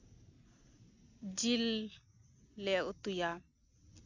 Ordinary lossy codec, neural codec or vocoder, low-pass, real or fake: none; none; 7.2 kHz; real